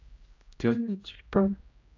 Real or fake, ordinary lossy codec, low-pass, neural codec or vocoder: fake; AAC, 48 kbps; 7.2 kHz; codec, 16 kHz, 0.5 kbps, X-Codec, HuBERT features, trained on general audio